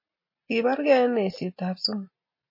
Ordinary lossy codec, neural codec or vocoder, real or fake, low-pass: MP3, 24 kbps; none; real; 5.4 kHz